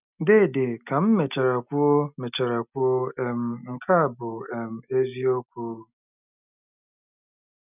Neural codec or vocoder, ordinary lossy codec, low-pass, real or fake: none; none; 3.6 kHz; real